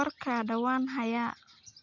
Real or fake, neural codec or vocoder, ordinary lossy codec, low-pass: real; none; none; 7.2 kHz